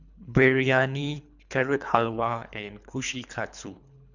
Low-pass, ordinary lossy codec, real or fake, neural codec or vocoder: 7.2 kHz; none; fake; codec, 24 kHz, 3 kbps, HILCodec